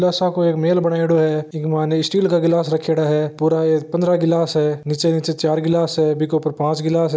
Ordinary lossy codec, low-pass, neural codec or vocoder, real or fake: none; none; none; real